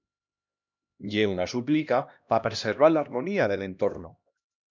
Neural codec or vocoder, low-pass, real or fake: codec, 16 kHz, 1 kbps, X-Codec, HuBERT features, trained on LibriSpeech; 7.2 kHz; fake